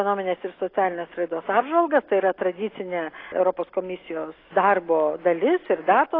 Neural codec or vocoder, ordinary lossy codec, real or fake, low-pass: none; AAC, 24 kbps; real; 5.4 kHz